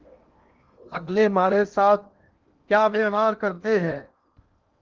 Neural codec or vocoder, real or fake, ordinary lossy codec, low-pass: codec, 16 kHz in and 24 kHz out, 0.8 kbps, FocalCodec, streaming, 65536 codes; fake; Opus, 32 kbps; 7.2 kHz